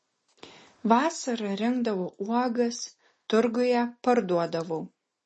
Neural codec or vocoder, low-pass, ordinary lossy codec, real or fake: none; 10.8 kHz; MP3, 32 kbps; real